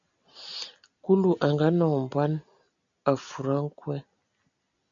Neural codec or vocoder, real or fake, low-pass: none; real; 7.2 kHz